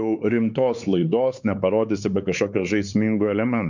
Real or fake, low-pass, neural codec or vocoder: fake; 7.2 kHz; codec, 16 kHz, 4 kbps, X-Codec, WavLM features, trained on Multilingual LibriSpeech